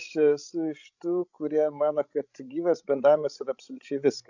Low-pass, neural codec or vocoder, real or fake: 7.2 kHz; codec, 16 kHz, 16 kbps, FreqCodec, larger model; fake